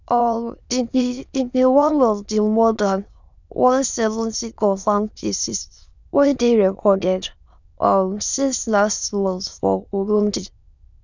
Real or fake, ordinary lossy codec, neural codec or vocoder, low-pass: fake; none; autoencoder, 22.05 kHz, a latent of 192 numbers a frame, VITS, trained on many speakers; 7.2 kHz